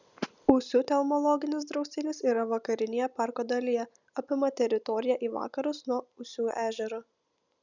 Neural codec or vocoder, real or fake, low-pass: none; real; 7.2 kHz